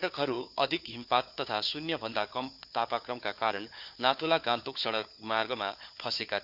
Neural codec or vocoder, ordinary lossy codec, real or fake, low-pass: codec, 16 kHz, 4 kbps, FunCodec, trained on Chinese and English, 50 frames a second; Opus, 64 kbps; fake; 5.4 kHz